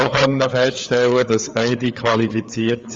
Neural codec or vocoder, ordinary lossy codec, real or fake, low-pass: codec, 16 kHz, 4 kbps, FreqCodec, larger model; Opus, 32 kbps; fake; 7.2 kHz